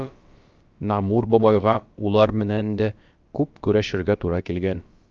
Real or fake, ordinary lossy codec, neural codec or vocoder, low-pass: fake; Opus, 24 kbps; codec, 16 kHz, about 1 kbps, DyCAST, with the encoder's durations; 7.2 kHz